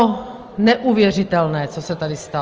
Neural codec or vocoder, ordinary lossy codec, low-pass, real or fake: none; Opus, 24 kbps; 7.2 kHz; real